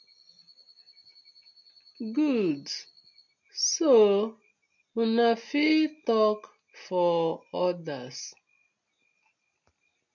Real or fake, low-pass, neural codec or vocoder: real; 7.2 kHz; none